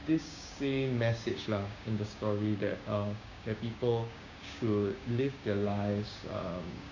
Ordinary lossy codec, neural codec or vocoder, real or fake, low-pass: none; codec, 16 kHz, 6 kbps, DAC; fake; 7.2 kHz